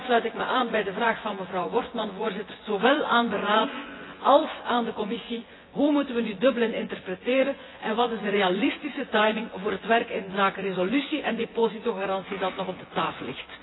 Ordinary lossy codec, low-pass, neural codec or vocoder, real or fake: AAC, 16 kbps; 7.2 kHz; vocoder, 24 kHz, 100 mel bands, Vocos; fake